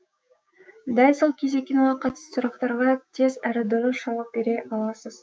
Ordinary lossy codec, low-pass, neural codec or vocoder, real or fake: none; none; codec, 16 kHz, 6 kbps, DAC; fake